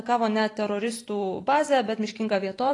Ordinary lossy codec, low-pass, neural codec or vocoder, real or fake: AAC, 32 kbps; 10.8 kHz; vocoder, 44.1 kHz, 128 mel bands every 512 samples, BigVGAN v2; fake